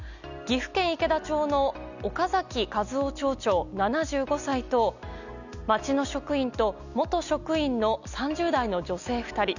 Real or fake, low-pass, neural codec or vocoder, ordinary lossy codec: real; 7.2 kHz; none; none